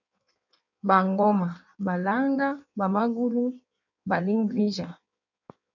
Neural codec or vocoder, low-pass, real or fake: codec, 16 kHz in and 24 kHz out, 1.1 kbps, FireRedTTS-2 codec; 7.2 kHz; fake